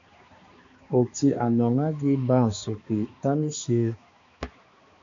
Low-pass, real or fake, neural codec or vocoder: 7.2 kHz; fake; codec, 16 kHz, 2 kbps, X-Codec, HuBERT features, trained on balanced general audio